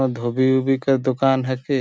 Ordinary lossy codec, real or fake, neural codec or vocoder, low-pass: none; real; none; none